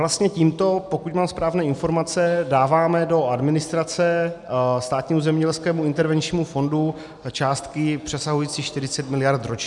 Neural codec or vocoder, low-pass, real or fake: none; 10.8 kHz; real